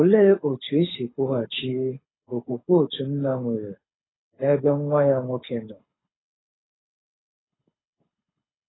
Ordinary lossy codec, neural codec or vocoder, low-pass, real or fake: AAC, 16 kbps; codec, 24 kHz, 6 kbps, HILCodec; 7.2 kHz; fake